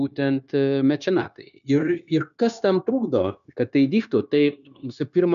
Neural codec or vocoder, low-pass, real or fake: codec, 16 kHz, 0.9 kbps, LongCat-Audio-Codec; 7.2 kHz; fake